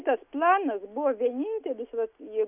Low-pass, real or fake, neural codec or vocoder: 3.6 kHz; real; none